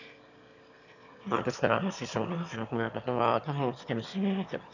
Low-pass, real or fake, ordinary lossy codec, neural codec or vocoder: 7.2 kHz; fake; none; autoencoder, 22.05 kHz, a latent of 192 numbers a frame, VITS, trained on one speaker